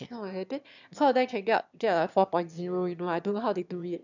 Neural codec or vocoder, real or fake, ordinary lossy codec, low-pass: autoencoder, 22.05 kHz, a latent of 192 numbers a frame, VITS, trained on one speaker; fake; none; 7.2 kHz